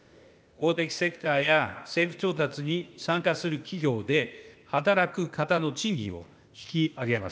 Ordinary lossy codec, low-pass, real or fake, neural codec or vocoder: none; none; fake; codec, 16 kHz, 0.8 kbps, ZipCodec